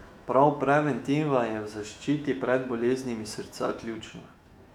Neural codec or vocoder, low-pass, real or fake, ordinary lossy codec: autoencoder, 48 kHz, 128 numbers a frame, DAC-VAE, trained on Japanese speech; 19.8 kHz; fake; none